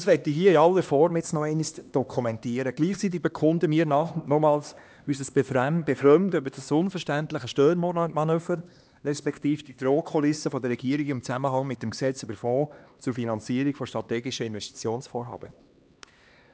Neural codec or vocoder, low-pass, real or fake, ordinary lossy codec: codec, 16 kHz, 2 kbps, X-Codec, HuBERT features, trained on LibriSpeech; none; fake; none